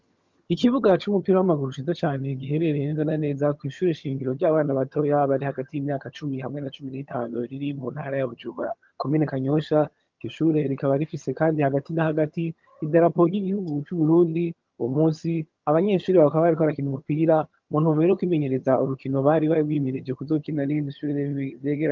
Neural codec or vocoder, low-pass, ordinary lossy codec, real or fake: vocoder, 22.05 kHz, 80 mel bands, HiFi-GAN; 7.2 kHz; Opus, 32 kbps; fake